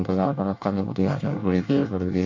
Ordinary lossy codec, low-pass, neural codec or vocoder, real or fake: MP3, 48 kbps; 7.2 kHz; codec, 24 kHz, 1 kbps, SNAC; fake